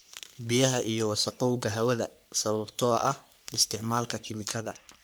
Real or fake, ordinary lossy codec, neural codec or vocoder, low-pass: fake; none; codec, 44.1 kHz, 3.4 kbps, Pupu-Codec; none